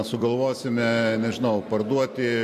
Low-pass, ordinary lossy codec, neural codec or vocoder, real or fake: 14.4 kHz; MP3, 64 kbps; vocoder, 44.1 kHz, 128 mel bands every 256 samples, BigVGAN v2; fake